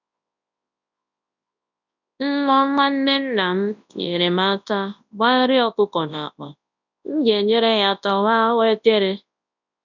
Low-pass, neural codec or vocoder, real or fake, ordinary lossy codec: 7.2 kHz; codec, 24 kHz, 0.9 kbps, WavTokenizer, large speech release; fake; none